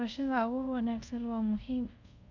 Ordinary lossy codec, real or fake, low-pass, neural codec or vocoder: none; fake; 7.2 kHz; codec, 24 kHz, 0.5 kbps, DualCodec